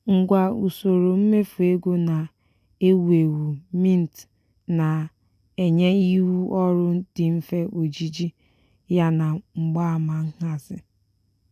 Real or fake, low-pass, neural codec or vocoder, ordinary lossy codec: real; 14.4 kHz; none; none